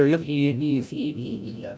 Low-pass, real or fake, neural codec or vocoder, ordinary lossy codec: none; fake; codec, 16 kHz, 0.5 kbps, FreqCodec, larger model; none